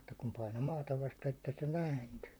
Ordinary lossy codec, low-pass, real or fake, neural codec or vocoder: none; none; fake; vocoder, 44.1 kHz, 128 mel bands, Pupu-Vocoder